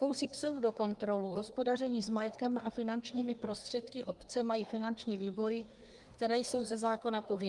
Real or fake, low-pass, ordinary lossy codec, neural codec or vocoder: fake; 10.8 kHz; Opus, 32 kbps; codec, 24 kHz, 1 kbps, SNAC